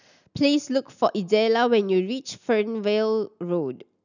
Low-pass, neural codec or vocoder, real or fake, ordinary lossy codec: 7.2 kHz; none; real; none